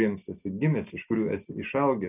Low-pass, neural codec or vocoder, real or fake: 3.6 kHz; none; real